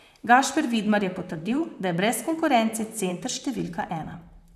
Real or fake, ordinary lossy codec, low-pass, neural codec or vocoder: fake; none; 14.4 kHz; vocoder, 44.1 kHz, 128 mel bands, Pupu-Vocoder